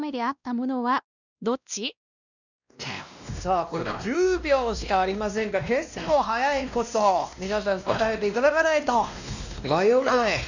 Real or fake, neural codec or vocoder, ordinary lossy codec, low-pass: fake; codec, 16 kHz, 1 kbps, X-Codec, WavLM features, trained on Multilingual LibriSpeech; none; 7.2 kHz